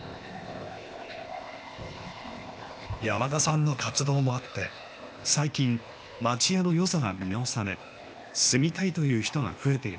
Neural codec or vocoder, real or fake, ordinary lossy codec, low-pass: codec, 16 kHz, 0.8 kbps, ZipCodec; fake; none; none